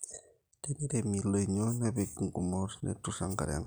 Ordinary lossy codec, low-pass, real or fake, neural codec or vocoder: none; none; real; none